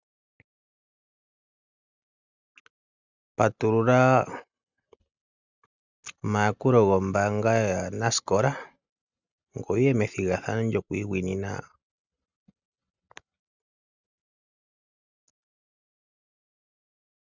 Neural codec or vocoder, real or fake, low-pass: none; real; 7.2 kHz